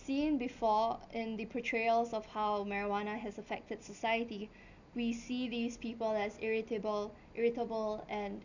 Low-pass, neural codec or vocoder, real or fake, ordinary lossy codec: 7.2 kHz; none; real; none